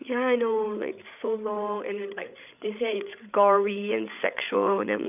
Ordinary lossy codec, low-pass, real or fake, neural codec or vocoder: none; 3.6 kHz; fake; codec, 16 kHz, 8 kbps, FreqCodec, larger model